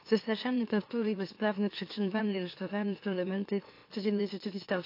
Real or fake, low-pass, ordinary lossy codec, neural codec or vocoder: fake; 5.4 kHz; AAC, 32 kbps; autoencoder, 44.1 kHz, a latent of 192 numbers a frame, MeloTTS